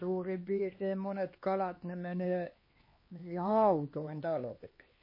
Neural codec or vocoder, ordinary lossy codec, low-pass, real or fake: codec, 16 kHz, 2 kbps, X-Codec, HuBERT features, trained on LibriSpeech; MP3, 24 kbps; 5.4 kHz; fake